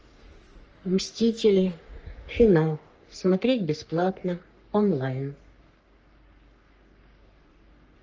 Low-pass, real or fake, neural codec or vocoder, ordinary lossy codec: 7.2 kHz; fake; codec, 44.1 kHz, 3.4 kbps, Pupu-Codec; Opus, 24 kbps